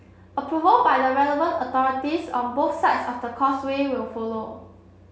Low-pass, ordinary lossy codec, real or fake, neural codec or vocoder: none; none; real; none